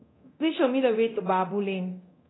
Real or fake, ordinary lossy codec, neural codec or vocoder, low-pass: fake; AAC, 16 kbps; codec, 24 kHz, 0.9 kbps, DualCodec; 7.2 kHz